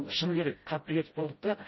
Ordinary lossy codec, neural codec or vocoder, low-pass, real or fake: MP3, 24 kbps; codec, 16 kHz, 0.5 kbps, FreqCodec, smaller model; 7.2 kHz; fake